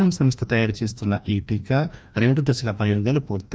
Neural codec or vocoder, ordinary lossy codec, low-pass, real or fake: codec, 16 kHz, 1 kbps, FreqCodec, larger model; none; none; fake